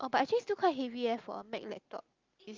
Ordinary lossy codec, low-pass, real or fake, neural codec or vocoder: Opus, 24 kbps; 7.2 kHz; real; none